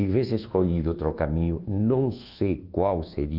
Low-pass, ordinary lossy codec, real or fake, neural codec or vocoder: 5.4 kHz; Opus, 24 kbps; fake; codec, 24 kHz, 1.2 kbps, DualCodec